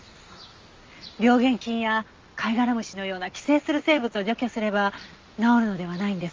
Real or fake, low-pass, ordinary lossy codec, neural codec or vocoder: real; 7.2 kHz; Opus, 32 kbps; none